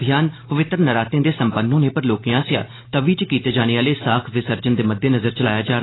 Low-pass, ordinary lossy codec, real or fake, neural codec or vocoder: 7.2 kHz; AAC, 16 kbps; real; none